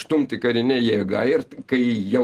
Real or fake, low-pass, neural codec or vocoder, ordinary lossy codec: real; 14.4 kHz; none; Opus, 24 kbps